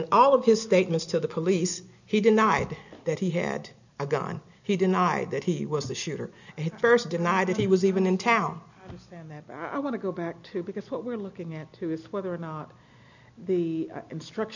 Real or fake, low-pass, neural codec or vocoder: real; 7.2 kHz; none